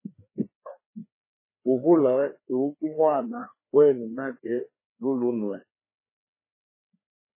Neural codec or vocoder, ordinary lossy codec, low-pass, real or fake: codec, 16 kHz, 2 kbps, FreqCodec, larger model; MP3, 16 kbps; 3.6 kHz; fake